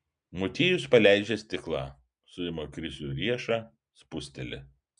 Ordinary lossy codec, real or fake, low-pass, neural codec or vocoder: MP3, 96 kbps; real; 9.9 kHz; none